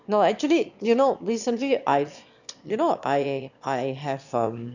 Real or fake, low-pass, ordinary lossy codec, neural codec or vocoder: fake; 7.2 kHz; none; autoencoder, 22.05 kHz, a latent of 192 numbers a frame, VITS, trained on one speaker